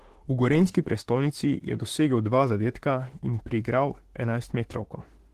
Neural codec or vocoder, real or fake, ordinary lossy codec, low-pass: autoencoder, 48 kHz, 32 numbers a frame, DAC-VAE, trained on Japanese speech; fake; Opus, 16 kbps; 14.4 kHz